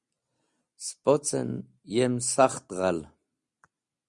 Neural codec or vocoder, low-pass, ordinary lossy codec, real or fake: none; 10.8 kHz; Opus, 64 kbps; real